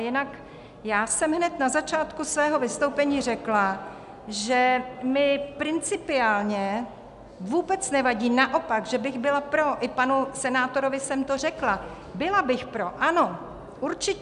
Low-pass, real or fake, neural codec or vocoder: 10.8 kHz; real; none